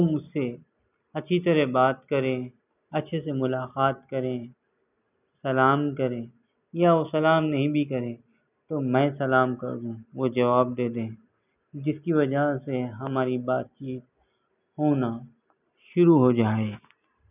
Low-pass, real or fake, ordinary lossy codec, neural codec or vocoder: 3.6 kHz; real; none; none